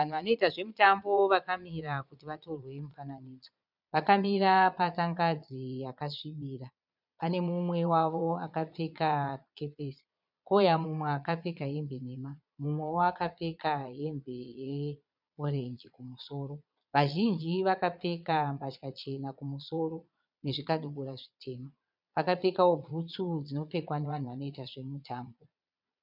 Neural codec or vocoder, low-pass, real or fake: vocoder, 22.05 kHz, 80 mel bands, Vocos; 5.4 kHz; fake